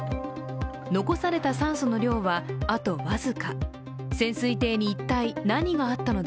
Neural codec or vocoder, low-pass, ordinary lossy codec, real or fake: none; none; none; real